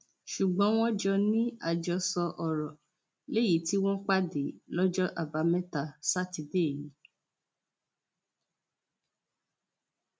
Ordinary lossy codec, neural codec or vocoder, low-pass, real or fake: none; none; none; real